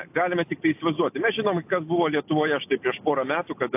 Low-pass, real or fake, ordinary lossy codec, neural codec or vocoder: 3.6 kHz; real; AAC, 32 kbps; none